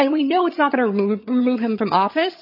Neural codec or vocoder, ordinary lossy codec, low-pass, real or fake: vocoder, 22.05 kHz, 80 mel bands, HiFi-GAN; MP3, 24 kbps; 5.4 kHz; fake